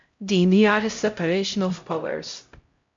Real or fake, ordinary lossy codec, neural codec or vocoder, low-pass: fake; MP3, 48 kbps; codec, 16 kHz, 0.5 kbps, X-Codec, HuBERT features, trained on LibriSpeech; 7.2 kHz